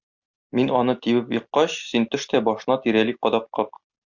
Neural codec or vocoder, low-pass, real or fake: none; 7.2 kHz; real